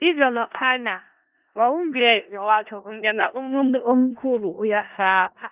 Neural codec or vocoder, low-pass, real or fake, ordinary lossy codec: codec, 16 kHz in and 24 kHz out, 0.4 kbps, LongCat-Audio-Codec, four codebook decoder; 3.6 kHz; fake; Opus, 32 kbps